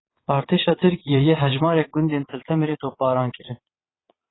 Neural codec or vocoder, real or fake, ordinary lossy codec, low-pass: vocoder, 22.05 kHz, 80 mel bands, Vocos; fake; AAC, 16 kbps; 7.2 kHz